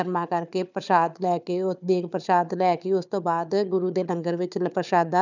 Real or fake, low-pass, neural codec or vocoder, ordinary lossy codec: fake; 7.2 kHz; codec, 16 kHz, 4 kbps, FunCodec, trained on Chinese and English, 50 frames a second; none